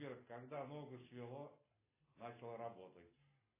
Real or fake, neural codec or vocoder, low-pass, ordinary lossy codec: real; none; 3.6 kHz; AAC, 16 kbps